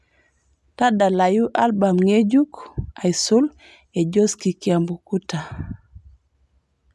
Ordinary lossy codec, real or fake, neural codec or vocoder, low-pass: none; real; none; none